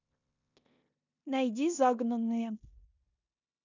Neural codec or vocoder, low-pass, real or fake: codec, 16 kHz in and 24 kHz out, 0.9 kbps, LongCat-Audio-Codec, four codebook decoder; 7.2 kHz; fake